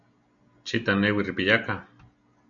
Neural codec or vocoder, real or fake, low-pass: none; real; 7.2 kHz